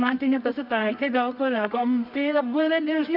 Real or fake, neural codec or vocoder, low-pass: fake; codec, 24 kHz, 0.9 kbps, WavTokenizer, medium music audio release; 5.4 kHz